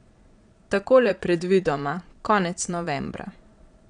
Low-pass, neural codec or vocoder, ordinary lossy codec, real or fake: 9.9 kHz; vocoder, 22.05 kHz, 80 mel bands, Vocos; none; fake